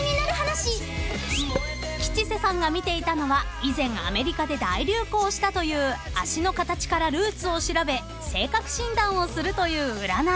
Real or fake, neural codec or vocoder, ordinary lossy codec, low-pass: real; none; none; none